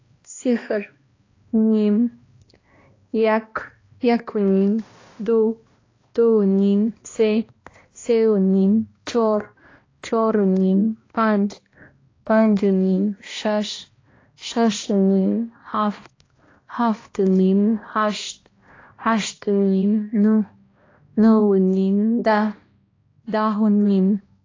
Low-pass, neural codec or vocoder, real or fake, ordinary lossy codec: 7.2 kHz; codec, 16 kHz, 1 kbps, X-Codec, HuBERT features, trained on balanced general audio; fake; AAC, 32 kbps